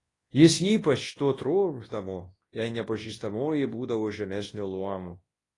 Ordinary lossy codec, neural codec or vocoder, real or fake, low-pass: AAC, 32 kbps; codec, 24 kHz, 0.9 kbps, WavTokenizer, large speech release; fake; 10.8 kHz